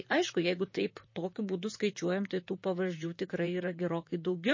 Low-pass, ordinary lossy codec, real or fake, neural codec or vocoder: 7.2 kHz; MP3, 32 kbps; fake; vocoder, 44.1 kHz, 128 mel bands, Pupu-Vocoder